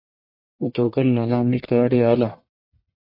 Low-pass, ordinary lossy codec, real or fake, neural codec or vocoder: 5.4 kHz; MP3, 32 kbps; fake; codec, 44.1 kHz, 1.7 kbps, Pupu-Codec